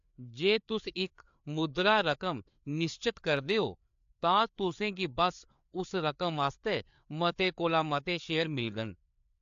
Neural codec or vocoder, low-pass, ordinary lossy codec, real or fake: codec, 16 kHz, 4 kbps, FreqCodec, larger model; 7.2 kHz; AAC, 64 kbps; fake